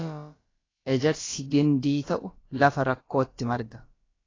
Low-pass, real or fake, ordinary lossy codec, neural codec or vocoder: 7.2 kHz; fake; AAC, 32 kbps; codec, 16 kHz, about 1 kbps, DyCAST, with the encoder's durations